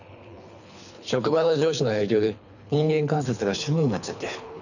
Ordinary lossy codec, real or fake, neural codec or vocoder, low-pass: none; fake; codec, 24 kHz, 3 kbps, HILCodec; 7.2 kHz